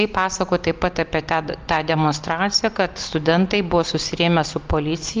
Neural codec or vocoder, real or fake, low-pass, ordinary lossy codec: none; real; 7.2 kHz; Opus, 32 kbps